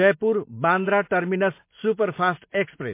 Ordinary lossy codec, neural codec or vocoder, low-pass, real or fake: MP3, 24 kbps; none; 3.6 kHz; real